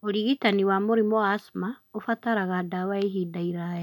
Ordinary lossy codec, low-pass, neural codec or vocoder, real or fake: none; 19.8 kHz; none; real